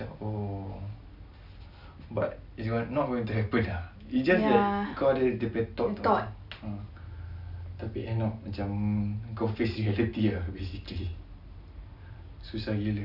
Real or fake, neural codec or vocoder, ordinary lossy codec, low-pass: real; none; none; 5.4 kHz